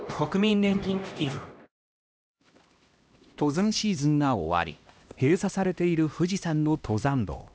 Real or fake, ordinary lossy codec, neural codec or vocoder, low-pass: fake; none; codec, 16 kHz, 1 kbps, X-Codec, HuBERT features, trained on LibriSpeech; none